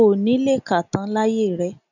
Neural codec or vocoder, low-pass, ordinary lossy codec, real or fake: none; 7.2 kHz; none; real